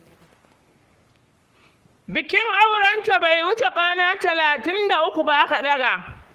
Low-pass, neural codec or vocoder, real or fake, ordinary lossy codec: 14.4 kHz; codec, 44.1 kHz, 3.4 kbps, Pupu-Codec; fake; Opus, 24 kbps